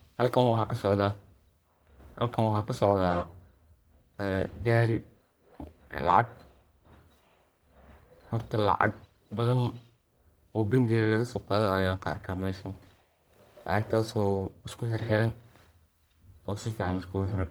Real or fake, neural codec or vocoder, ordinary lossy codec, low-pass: fake; codec, 44.1 kHz, 1.7 kbps, Pupu-Codec; none; none